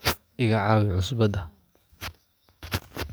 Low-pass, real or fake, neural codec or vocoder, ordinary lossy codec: none; fake; codec, 44.1 kHz, 7.8 kbps, DAC; none